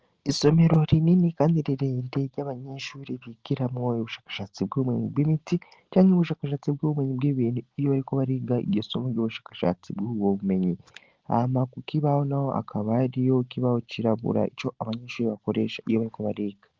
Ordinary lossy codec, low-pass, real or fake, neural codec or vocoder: Opus, 16 kbps; 7.2 kHz; real; none